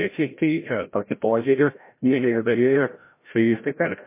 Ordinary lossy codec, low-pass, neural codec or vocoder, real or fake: MP3, 24 kbps; 3.6 kHz; codec, 16 kHz, 0.5 kbps, FreqCodec, larger model; fake